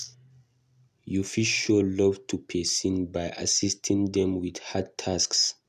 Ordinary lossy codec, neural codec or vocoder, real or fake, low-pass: none; none; real; 14.4 kHz